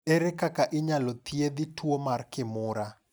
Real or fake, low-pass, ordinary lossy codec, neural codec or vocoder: real; none; none; none